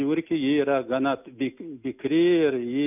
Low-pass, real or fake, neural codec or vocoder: 3.6 kHz; real; none